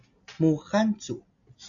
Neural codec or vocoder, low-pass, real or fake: none; 7.2 kHz; real